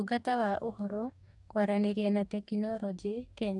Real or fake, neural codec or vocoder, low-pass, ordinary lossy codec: fake; codec, 44.1 kHz, 2.6 kbps, DAC; 10.8 kHz; none